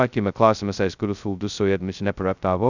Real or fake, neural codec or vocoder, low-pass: fake; codec, 16 kHz, 0.2 kbps, FocalCodec; 7.2 kHz